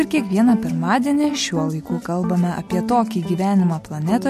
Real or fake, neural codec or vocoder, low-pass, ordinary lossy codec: real; none; 14.4 kHz; MP3, 64 kbps